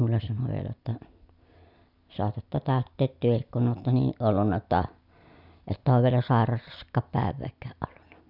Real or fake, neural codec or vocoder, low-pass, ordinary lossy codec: real; none; 5.4 kHz; none